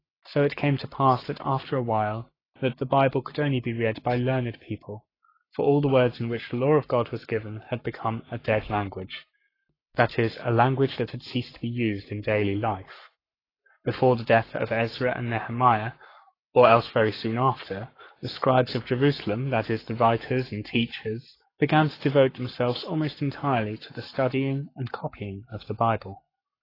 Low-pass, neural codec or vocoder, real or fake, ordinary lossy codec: 5.4 kHz; codec, 44.1 kHz, 7.8 kbps, Pupu-Codec; fake; AAC, 24 kbps